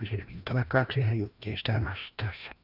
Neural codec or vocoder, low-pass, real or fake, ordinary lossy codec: codec, 16 kHz, 1 kbps, FreqCodec, larger model; 5.4 kHz; fake; none